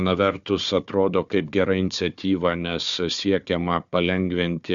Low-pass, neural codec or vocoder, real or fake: 7.2 kHz; codec, 16 kHz, 4 kbps, FunCodec, trained on Chinese and English, 50 frames a second; fake